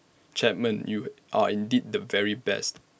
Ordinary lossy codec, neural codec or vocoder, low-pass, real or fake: none; none; none; real